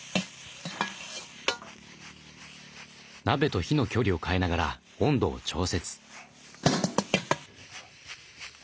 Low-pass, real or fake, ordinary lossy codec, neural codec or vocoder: none; real; none; none